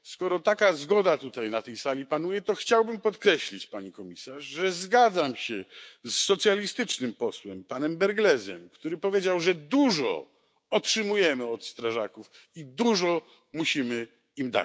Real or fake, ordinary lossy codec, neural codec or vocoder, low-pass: fake; none; codec, 16 kHz, 6 kbps, DAC; none